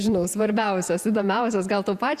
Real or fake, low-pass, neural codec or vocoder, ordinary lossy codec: fake; 14.4 kHz; vocoder, 48 kHz, 128 mel bands, Vocos; Opus, 64 kbps